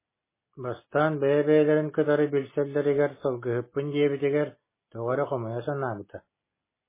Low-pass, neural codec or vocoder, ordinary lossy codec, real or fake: 3.6 kHz; none; MP3, 16 kbps; real